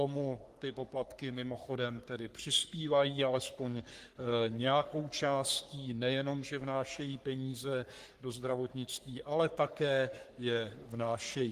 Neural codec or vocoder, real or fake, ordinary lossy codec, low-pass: codec, 44.1 kHz, 3.4 kbps, Pupu-Codec; fake; Opus, 24 kbps; 14.4 kHz